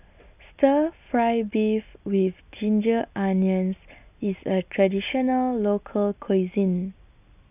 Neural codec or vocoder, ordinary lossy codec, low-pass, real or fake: none; none; 3.6 kHz; real